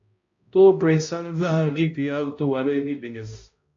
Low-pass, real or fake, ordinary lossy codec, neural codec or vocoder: 7.2 kHz; fake; AAC, 48 kbps; codec, 16 kHz, 0.5 kbps, X-Codec, HuBERT features, trained on balanced general audio